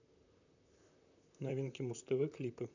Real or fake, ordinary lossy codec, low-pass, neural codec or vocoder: fake; none; 7.2 kHz; vocoder, 44.1 kHz, 128 mel bands, Pupu-Vocoder